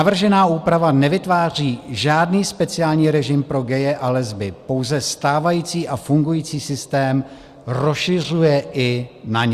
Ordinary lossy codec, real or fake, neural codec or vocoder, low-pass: Opus, 64 kbps; real; none; 14.4 kHz